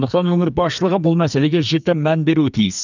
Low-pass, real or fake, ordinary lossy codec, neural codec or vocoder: 7.2 kHz; fake; none; codec, 44.1 kHz, 2.6 kbps, SNAC